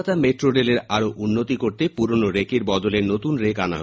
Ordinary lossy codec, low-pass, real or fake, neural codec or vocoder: none; none; real; none